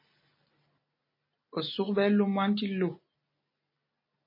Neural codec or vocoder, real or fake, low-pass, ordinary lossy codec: none; real; 5.4 kHz; MP3, 24 kbps